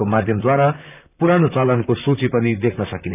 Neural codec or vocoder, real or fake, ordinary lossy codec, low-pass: vocoder, 44.1 kHz, 128 mel bands, Pupu-Vocoder; fake; none; 3.6 kHz